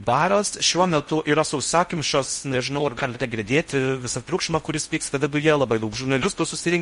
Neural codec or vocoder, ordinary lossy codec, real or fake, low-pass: codec, 16 kHz in and 24 kHz out, 0.6 kbps, FocalCodec, streaming, 4096 codes; MP3, 48 kbps; fake; 10.8 kHz